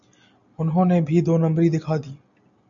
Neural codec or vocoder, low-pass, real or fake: none; 7.2 kHz; real